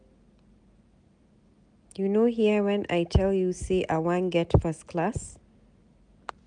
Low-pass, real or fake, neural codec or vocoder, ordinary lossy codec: 9.9 kHz; real; none; none